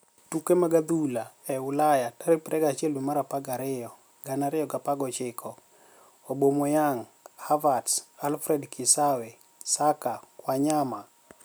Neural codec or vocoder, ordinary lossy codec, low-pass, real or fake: none; none; none; real